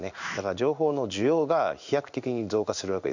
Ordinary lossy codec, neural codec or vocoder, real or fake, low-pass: none; codec, 16 kHz in and 24 kHz out, 1 kbps, XY-Tokenizer; fake; 7.2 kHz